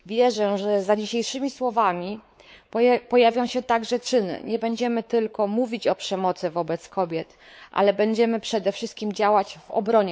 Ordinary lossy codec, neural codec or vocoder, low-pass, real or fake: none; codec, 16 kHz, 4 kbps, X-Codec, WavLM features, trained on Multilingual LibriSpeech; none; fake